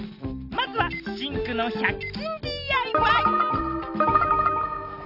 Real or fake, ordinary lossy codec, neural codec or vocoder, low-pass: real; none; none; 5.4 kHz